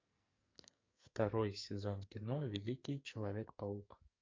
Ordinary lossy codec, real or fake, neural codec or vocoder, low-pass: MP3, 48 kbps; fake; codec, 44.1 kHz, 2.6 kbps, SNAC; 7.2 kHz